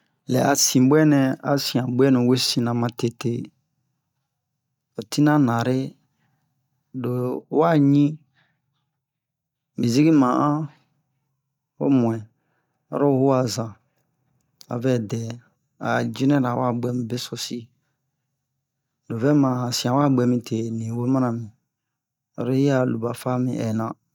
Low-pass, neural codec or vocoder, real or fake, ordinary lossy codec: 19.8 kHz; none; real; none